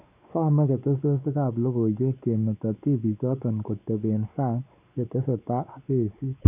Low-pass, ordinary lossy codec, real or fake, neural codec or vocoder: 3.6 kHz; none; fake; codec, 16 kHz, 4 kbps, X-Codec, WavLM features, trained on Multilingual LibriSpeech